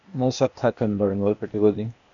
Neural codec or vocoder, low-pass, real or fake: codec, 16 kHz, 0.8 kbps, ZipCodec; 7.2 kHz; fake